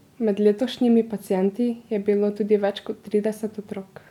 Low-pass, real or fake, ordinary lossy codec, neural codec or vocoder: 19.8 kHz; real; none; none